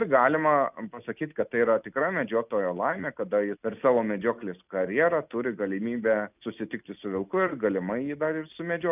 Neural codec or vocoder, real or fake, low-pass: none; real; 3.6 kHz